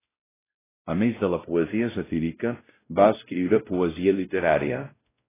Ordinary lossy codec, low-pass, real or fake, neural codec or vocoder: AAC, 16 kbps; 3.6 kHz; fake; codec, 16 kHz, 0.5 kbps, X-Codec, WavLM features, trained on Multilingual LibriSpeech